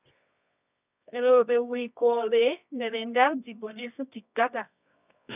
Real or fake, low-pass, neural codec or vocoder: fake; 3.6 kHz; codec, 24 kHz, 0.9 kbps, WavTokenizer, medium music audio release